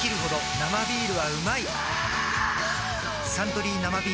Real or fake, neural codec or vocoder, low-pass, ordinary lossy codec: real; none; none; none